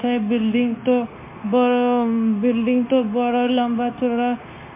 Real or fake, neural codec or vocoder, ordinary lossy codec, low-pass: fake; codec, 16 kHz, 0.9 kbps, LongCat-Audio-Codec; MP3, 32 kbps; 3.6 kHz